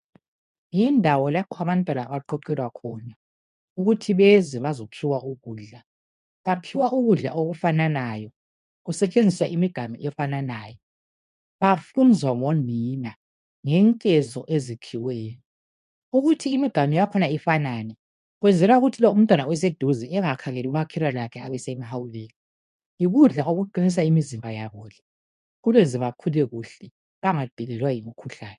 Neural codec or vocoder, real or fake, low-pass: codec, 24 kHz, 0.9 kbps, WavTokenizer, medium speech release version 2; fake; 10.8 kHz